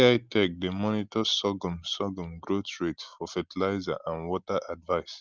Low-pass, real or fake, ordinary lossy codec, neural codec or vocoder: 7.2 kHz; real; Opus, 32 kbps; none